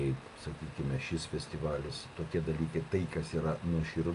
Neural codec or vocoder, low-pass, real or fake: none; 10.8 kHz; real